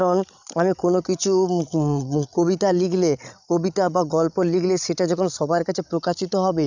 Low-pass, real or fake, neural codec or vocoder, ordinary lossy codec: 7.2 kHz; fake; codec, 16 kHz, 16 kbps, FreqCodec, larger model; none